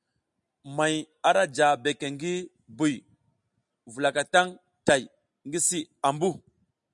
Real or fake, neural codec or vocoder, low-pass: real; none; 10.8 kHz